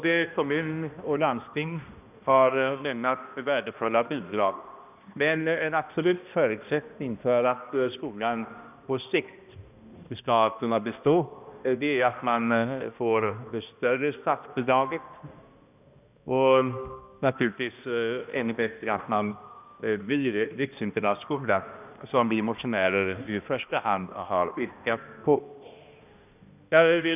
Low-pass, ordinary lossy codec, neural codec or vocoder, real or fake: 3.6 kHz; none; codec, 16 kHz, 1 kbps, X-Codec, HuBERT features, trained on balanced general audio; fake